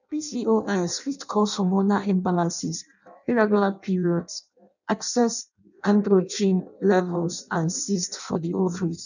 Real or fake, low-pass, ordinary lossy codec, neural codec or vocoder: fake; 7.2 kHz; none; codec, 16 kHz in and 24 kHz out, 0.6 kbps, FireRedTTS-2 codec